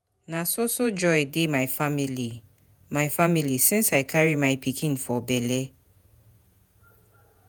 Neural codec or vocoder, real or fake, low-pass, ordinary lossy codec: vocoder, 48 kHz, 128 mel bands, Vocos; fake; none; none